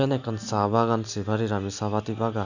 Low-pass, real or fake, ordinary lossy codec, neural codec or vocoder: 7.2 kHz; real; none; none